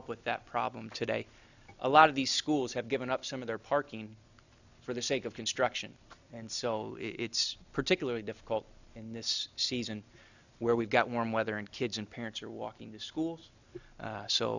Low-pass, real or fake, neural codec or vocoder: 7.2 kHz; real; none